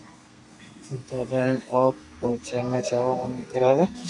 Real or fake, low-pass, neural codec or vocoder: fake; 10.8 kHz; codec, 32 kHz, 1.9 kbps, SNAC